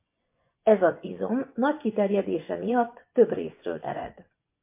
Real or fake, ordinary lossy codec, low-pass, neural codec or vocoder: fake; MP3, 24 kbps; 3.6 kHz; vocoder, 24 kHz, 100 mel bands, Vocos